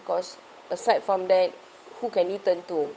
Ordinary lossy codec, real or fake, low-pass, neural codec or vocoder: none; fake; none; codec, 16 kHz, 8 kbps, FunCodec, trained on Chinese and English, 25 frames a second